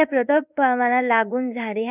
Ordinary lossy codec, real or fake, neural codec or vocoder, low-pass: none; fake; codec, 16 kHz in and 24 kHz out, 1 kbps, XY-Tokenizer; 3.6 kHz